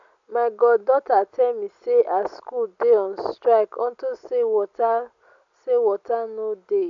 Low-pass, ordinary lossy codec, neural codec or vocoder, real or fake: 7.2 kHz; AAC, 64 kbps; none; real